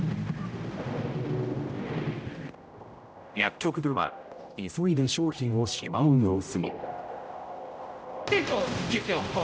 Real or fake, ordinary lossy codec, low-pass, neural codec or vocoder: fake; none; none; codec, 16 kHz, 0.5 kbps, X-Codec, HuBERT features, trained on general audio